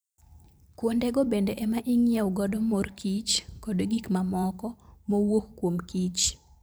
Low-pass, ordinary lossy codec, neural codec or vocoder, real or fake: none; none; vocoder, 44.1 kHz, 128 mel bands every 512 samples, BigVGAN v2; fake